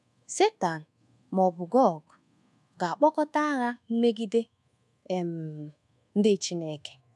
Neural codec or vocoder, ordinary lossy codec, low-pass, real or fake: codec, 24 kHz, 1.2 kbps, DualCodec; none; none; fake